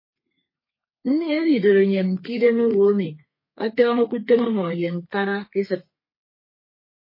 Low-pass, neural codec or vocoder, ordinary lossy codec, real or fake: 5.4 kHz; codec, 32 kHz, 1.9 kbps, SNAC; MP3, 24 kbps; fake